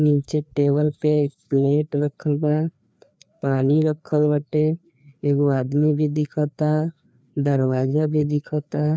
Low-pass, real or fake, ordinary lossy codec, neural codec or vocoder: none; fake; none; codec, 16 kHz, 2 kbps, FreqCodec, larger model